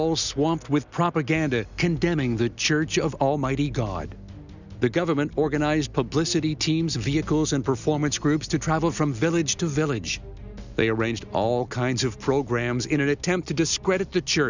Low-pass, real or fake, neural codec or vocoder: 7.2 kHz; real; none